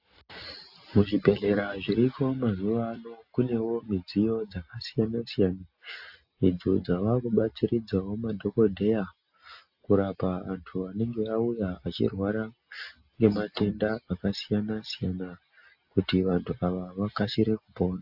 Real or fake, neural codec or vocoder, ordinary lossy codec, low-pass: real; none; AAC, 48 kbps; 5.4 kHz